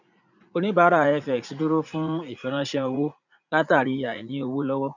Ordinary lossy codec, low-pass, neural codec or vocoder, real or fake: none; 7.2 kHz; vocoder, 44.1 kHz, 80 mel bands, Vocos; fake